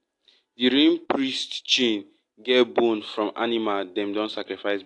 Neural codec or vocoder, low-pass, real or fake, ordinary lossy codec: none; 10.8 kHz; real; AAC, 48 kbps